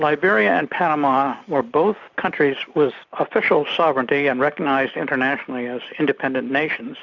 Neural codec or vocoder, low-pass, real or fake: none; 7.2 kHz; real